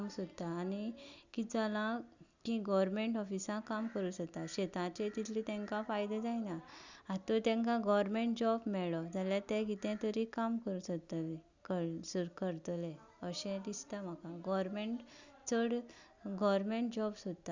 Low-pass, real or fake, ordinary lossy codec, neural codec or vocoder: 7.2 kHz; real; Opus, 64 kbps; none